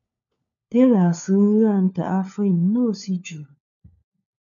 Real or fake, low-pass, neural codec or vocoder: fake; 7.2 kHz; codec, 16 kHz, 4 kbps, FunCodec, trained on LibriTTS, 50 frames a second